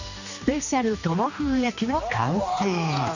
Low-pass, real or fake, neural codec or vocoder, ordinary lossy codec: 7.2 kHz; fake; codec, 16 kHz, 2 kbps, X-Codec, HuBERT features, trained on general audio; none